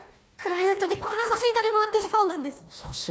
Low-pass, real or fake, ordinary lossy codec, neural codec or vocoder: none; fake; none; codec, 16 kHz, 1 kbps, FunCodec, trained on Chinese and English, 50 frames a second